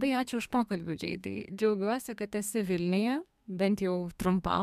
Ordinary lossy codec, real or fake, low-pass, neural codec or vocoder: MP3, 96 kbps; fake; 14.4 kHz; codec, 32 kHz, 1.9 kbps, SNAC